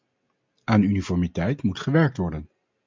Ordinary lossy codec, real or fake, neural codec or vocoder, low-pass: MP3, 64 kbps; real; none; 7.2 kHz